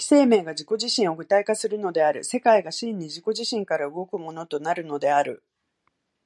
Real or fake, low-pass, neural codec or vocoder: real; 10.8 kHz; none